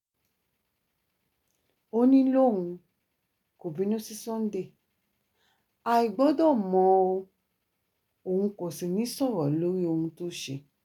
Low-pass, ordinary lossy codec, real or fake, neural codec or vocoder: 19.8 kHz; none; real; none